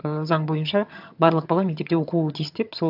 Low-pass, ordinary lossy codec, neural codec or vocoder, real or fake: 5.4 kHz; AAC, 48 kbps; vocoder, 22.05 kHz, 80 mel bands, HiFi-GAN; fake